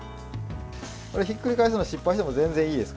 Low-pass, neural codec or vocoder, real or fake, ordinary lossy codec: none; none; real; none